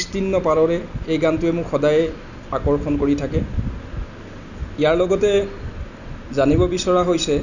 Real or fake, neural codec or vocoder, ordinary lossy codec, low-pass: real; none; none; 7.2 kHz